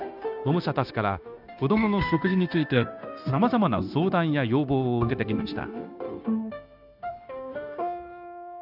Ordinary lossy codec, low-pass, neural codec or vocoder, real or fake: none; 5.4 kHz; codec, 16 kHz, 0.9 kbps, LongCat-Audio-Codec; fake